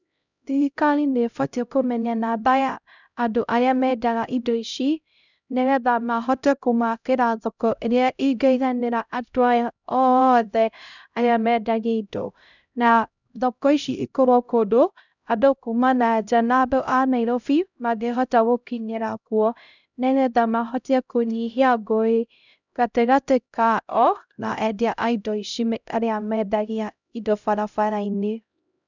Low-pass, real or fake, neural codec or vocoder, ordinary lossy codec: 7.2 kHz; fake; codec, 16 kHz, 0.5 kbps, X-Codec, HuBERT features, trained on LibriSpeech; none